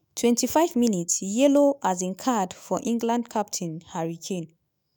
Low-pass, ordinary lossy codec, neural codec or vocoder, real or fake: none; none; autoencoder, 48 kHz, 128 numbers a frame, DAC-VAE, trained on Japanese speech; fake